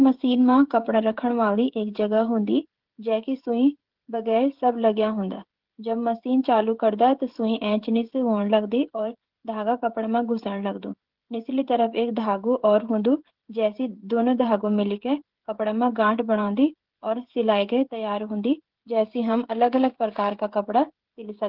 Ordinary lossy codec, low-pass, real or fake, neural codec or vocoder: Opus, 16 kbps; 5.4 kHz; fake; codec, 16 kHz, 16 kbps, FreqCodec, smaller model